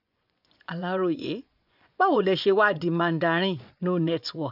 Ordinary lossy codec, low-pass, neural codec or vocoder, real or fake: none; 5.4 kHz; vocoder, 24 kHz, 100 mel bands, Vocos; fake